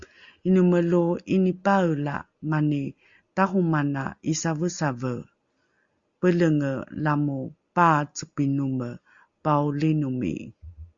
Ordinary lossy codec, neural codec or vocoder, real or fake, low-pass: Opus, 64 kbps; none; real; 7.2 kHz